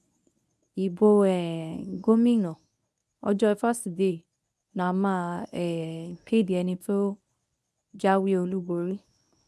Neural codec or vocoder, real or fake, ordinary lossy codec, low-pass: codec, 24 kHz, 0.9 kbps, WavTokenizer, medium speech release version 1; fake; none; none